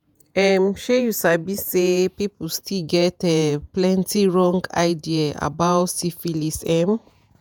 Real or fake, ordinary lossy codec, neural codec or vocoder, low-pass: fake; none; vocoder, 48 kHz, 128 mel bands, Vocos; none